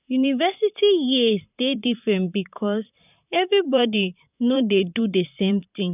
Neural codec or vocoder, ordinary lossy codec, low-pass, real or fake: vocoder, 44.1 kHz, 128 mel bands, Pupu-Vocoder; none; 3.6 kHz; fake